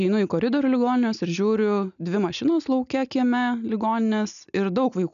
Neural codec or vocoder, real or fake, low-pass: none; real; 7.2 kHz